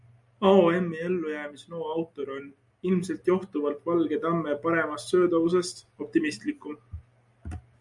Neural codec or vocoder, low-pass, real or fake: none; 10.8 kHz; real